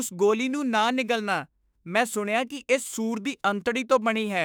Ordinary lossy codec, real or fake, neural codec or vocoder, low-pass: none; fake; autoencoder, 48 kHz, 32 numbers a frame, DAC-VAE, trained on Japanese speech; none